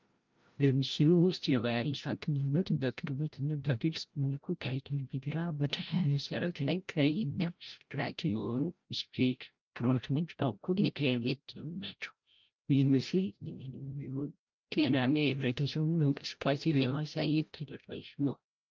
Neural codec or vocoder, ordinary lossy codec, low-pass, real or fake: codec, 16 kHz, 0.5 kbps, FreqCodec, larger model; Opus, 24 kbps; 7.2 kHz; fake